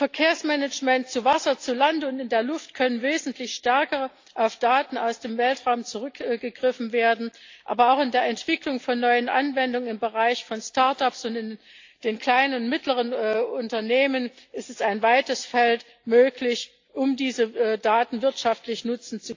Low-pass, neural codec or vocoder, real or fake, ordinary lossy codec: 7.2 kHz; none; real; AAC, 48 kbps